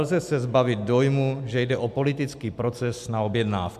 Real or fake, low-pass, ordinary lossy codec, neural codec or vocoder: real; 14.4 kHz; MP3, 96 kbps; none